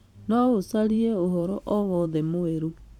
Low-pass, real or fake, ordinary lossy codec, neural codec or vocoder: 19.8 kHz; fake; none; vocoder, 44.1 kHz, 128 mel bands every 512 samples, BigVGAN v2